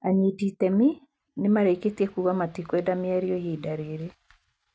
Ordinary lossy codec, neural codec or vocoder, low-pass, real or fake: none; none; none; real